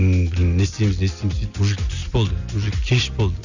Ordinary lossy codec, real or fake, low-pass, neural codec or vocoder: none; real; 7.2 kHz; none